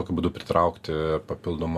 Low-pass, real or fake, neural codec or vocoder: 14.4 kHz; real; none